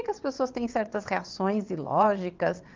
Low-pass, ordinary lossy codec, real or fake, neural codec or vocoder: 7.2 kHz; Opus, 24 kbps; fake; vocoder, 22.05 kHz, 80 mel bands, WaveNeXt